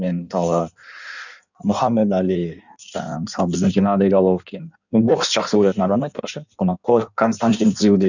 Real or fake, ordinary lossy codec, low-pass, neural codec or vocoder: fake; none; 7.2 kHz; codec, 16 kHz in and 24 kHz out, 1.1 kbps, FireRedTTS-2 codec